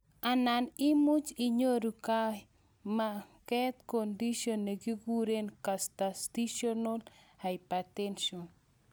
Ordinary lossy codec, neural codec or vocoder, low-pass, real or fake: none; none; none; real